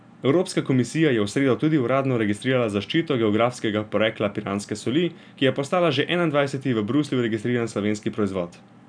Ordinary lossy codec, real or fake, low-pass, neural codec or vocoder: none; real; 9.9 kHz; none